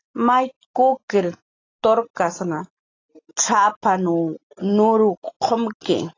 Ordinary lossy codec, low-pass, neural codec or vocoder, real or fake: AAC, 32 kbps; 7.2 kHz; none; real